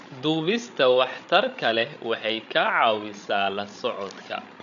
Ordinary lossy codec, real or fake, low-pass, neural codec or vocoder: none; fake; 7.2 kHz; codec, 16 kHz, 16 kbps, FreqCodec, larger model